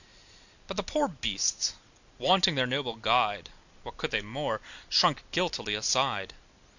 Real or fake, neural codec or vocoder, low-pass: real; none; 7.2 kHz